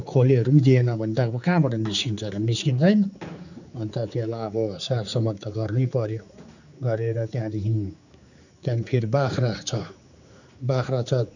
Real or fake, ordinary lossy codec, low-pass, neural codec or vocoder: fake; none; 7.2 kHz; codec, 16 kHz, 4 kbps, X-Codec, HuBERT features, trained on general audio